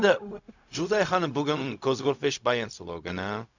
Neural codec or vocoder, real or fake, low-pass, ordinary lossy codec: codec, 16 kHz, 0.4 kbps, LongCat-Audio-Codec; fake; 7.2 kHz; none